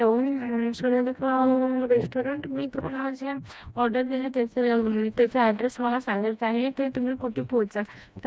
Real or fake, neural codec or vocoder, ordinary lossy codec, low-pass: fake; codec, 16 kHz, 1 kbps, FreqCodec, smaller model; none; none